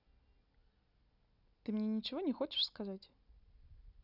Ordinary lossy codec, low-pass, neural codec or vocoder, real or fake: none; 5.4 kHz; none; real